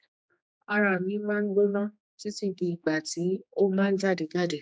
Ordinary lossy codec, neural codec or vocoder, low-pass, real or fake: none; codec, 16 kHz, 2 kbps, X-Codec, HuBERT features, trained on general audio; none; fake